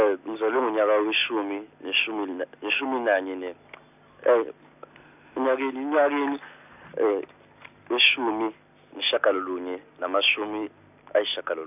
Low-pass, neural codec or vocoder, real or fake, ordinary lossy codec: 3.6 kHz; none; real; none